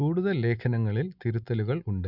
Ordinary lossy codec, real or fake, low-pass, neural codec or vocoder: none; real; 5.4 kHz; none